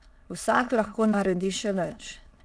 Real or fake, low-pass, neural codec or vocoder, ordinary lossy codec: fake; none; autoencoder, 22.05 kHz, a latent of 192 numbers a frame, VITS, trained on many speakers; none